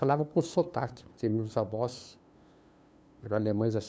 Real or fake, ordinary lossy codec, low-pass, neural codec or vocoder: fake; none; none; codec, 16 kHz, 2 kbps, FunCodec, trained on LibriTTS, 25 frames a second